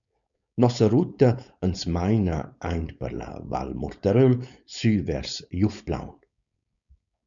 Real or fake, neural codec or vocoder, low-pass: fake; codec, 16 kHz, 4.8 kbps, FACodec; 7.2 kHz